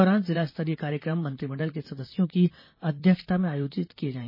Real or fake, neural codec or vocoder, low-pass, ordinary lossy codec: fake; vocoder, 44.1 kHz, 128 mel bands, Pupu-Vocoder; 5.4 kHz; MP3, 24 kbps